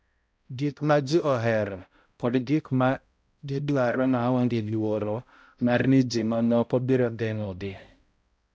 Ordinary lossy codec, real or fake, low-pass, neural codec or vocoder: none; fake; none; codec, 16 kHz, 0.5 kbps, X-Codec, HuBERT features, trained on balanced general audio